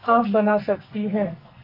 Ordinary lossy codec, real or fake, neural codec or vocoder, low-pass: MP3, 32 kbps; fake; codec, 44.1 kHz, 2.6 kbps, SNAC; 5.4 kHz